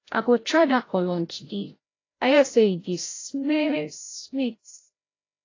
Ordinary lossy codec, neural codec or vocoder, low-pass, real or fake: AAC, 32 kbps; codec, 16 kHz, 0.5 kbps, FreqCodec, larger model; 7.2 kHz; fake